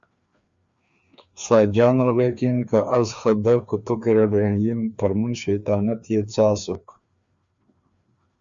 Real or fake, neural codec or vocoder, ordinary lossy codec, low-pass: fake; codec, 16 kHz, 2 kbps, FreqCodec, larger model; Opus, 64 kbps; 7.2 kHz